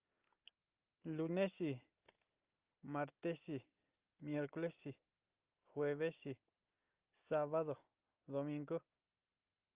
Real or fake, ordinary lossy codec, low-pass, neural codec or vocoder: real; Opus, 24 kbps; 3.6 kHz; none